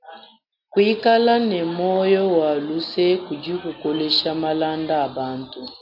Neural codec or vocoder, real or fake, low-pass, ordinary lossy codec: none; real; 5.4 kHz; AAC, 48 kbps